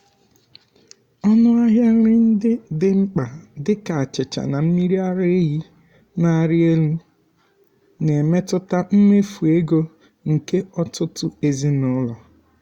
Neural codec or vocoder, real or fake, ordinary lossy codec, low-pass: none; real; Opus, 64 kbps; 19.8 kHz